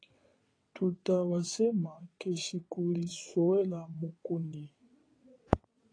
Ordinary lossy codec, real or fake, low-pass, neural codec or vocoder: AAC, 32 kbps; fake; 9.9 kHz; vocoder, 22.05 kHz, 80 mel bands, WaveNeXt